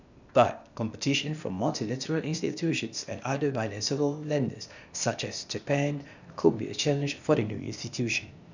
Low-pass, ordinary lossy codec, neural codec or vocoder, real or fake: 7.2 kHz; none; codec, 16 kHz, 0.8 kbps, ZipCodec; fake